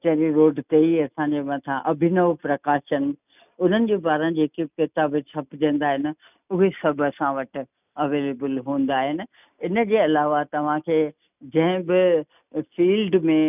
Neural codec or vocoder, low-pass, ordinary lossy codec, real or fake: none; 3.6 kHz; none; real